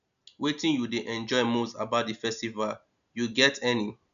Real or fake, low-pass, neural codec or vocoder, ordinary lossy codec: real; 7.2 kHz; none; none